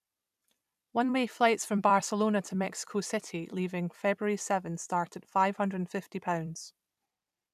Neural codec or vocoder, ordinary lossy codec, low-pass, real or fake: vocoder, 44.1 kHz, 128 mel bands every 256 samples, BigVGAN v2; none; 14.4 kHz; fake